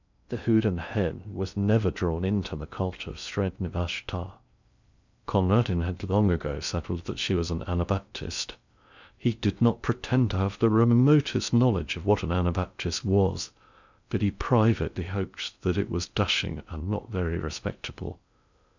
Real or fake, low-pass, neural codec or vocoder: fake; 7.2 kHz; codec, 16 kHz in and 24 kHz out, 0.6 kbps, FocalCodec, streaming, 2048 codes